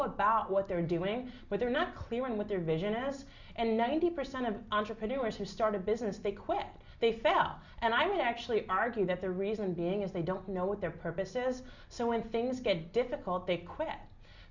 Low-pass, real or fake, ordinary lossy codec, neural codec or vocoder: 7.2 kHz; real; Opus, 64 kbps; none